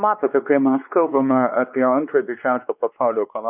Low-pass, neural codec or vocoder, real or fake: 3.6 kHz; codec, 16 kHz, 2 kbps, X-Codec, WavLM features, trained on Multilingual LibriSpeech; fake